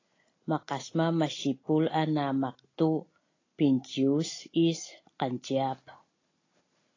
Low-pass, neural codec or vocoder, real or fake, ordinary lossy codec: 7.2 kHz; none; real; AAC, 32 kbps